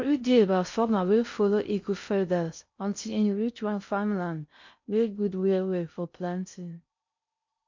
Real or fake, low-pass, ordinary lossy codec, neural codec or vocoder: fake; 7.2 kHz; MP3, 48 kbps; codec, 16 kHz in and 24 kHz out, 0.6 kbps, FocalCodec, streaming, 4096 codes